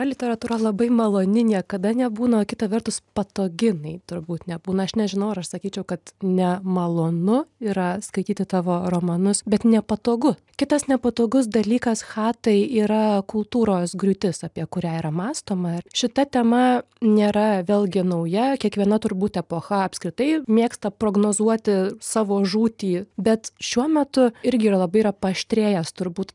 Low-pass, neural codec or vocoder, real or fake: 10.8 kHz; none; real